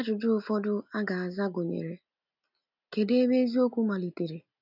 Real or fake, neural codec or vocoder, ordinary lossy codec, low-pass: real; none; none; 5.4 kHz